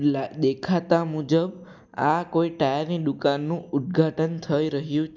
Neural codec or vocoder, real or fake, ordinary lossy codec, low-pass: none; real; none; none